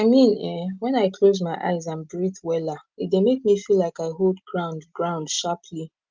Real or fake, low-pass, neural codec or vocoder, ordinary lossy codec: real; 7.2 kHz; none; Opus, 24 kbps